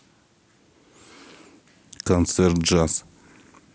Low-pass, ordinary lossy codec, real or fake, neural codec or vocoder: none; none; real; none